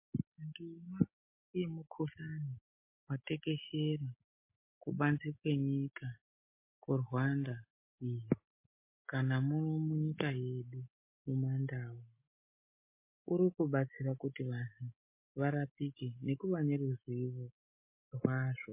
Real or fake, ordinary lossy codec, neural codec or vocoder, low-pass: real; MP3, 24 kbps; none; 3.6 kHz